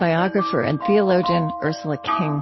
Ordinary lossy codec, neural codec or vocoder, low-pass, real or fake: MP3, 24 kbps; none; 7.2 kHz; real